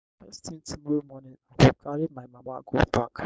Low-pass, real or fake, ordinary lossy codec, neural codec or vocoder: none; fake; none; codec, 16 kHz, 4.8 kbps, FACodec